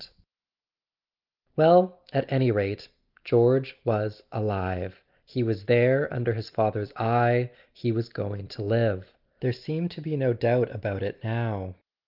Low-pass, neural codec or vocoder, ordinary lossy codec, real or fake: 5.4 kHz; none; Opus, 32 kbps; real